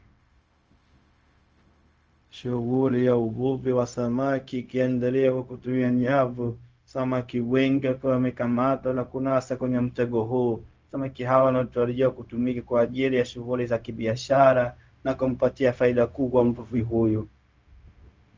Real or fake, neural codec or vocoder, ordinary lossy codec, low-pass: fake; codec, 16 kHz, 0.4 kbps, LongCat-Audio-Codec; Opus, 24 kbps; 7.2 kHz